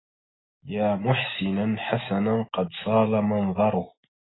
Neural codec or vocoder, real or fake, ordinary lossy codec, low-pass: none; real; AAC, 16 kbps; 7.2 kHz